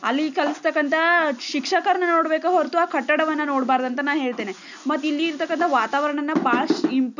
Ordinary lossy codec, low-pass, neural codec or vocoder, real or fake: none; 7.2 kHz; none; real